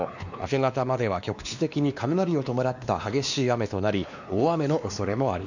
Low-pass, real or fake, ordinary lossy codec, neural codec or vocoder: 7.2 kHz; fake; none; codec, 16 kHz, 2 kbps, X-Codec, WavLM features, trained on Multilingual LibriSpeech